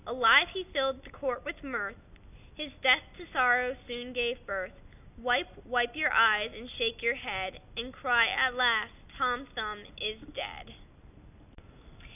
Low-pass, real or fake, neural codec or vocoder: 3.6 kHz; real; none